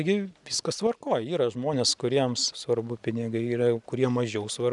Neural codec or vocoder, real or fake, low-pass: none; real; 10.8 kHz